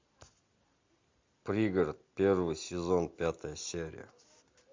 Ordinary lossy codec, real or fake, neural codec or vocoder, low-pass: MP3, 48 kbps; real; none; 7.2 kHz